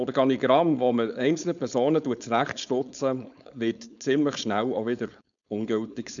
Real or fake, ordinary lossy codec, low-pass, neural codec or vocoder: fake; none; 7.2 kHz; codec, 16 kHz, 4.8 kbps, FACodec